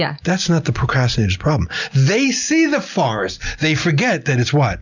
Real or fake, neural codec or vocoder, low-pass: real; none; 7.2 kHz